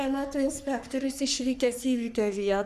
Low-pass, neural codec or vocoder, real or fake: 14.4 kHz; codec, 44.1 kHz, 3.4 kbps, Pupu-Codec; fake